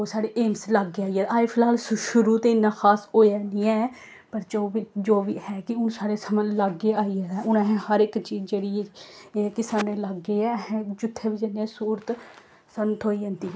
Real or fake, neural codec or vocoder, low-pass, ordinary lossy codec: real; none; none; none